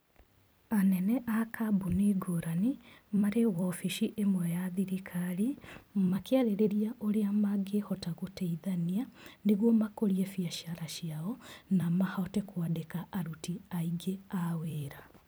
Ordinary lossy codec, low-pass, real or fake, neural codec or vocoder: none; none; fake; vocoder, 44.1 kHz, 128 mel bands every 256 samples, BigVGAN v2